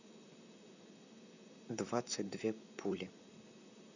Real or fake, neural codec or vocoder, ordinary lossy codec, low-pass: fake; vocoder, 44.1 kHz, 128 mel bands, Pupu-Vocoder; none; 7.2 kHz